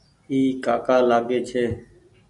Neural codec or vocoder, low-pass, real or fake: none; 10.8 kHz; real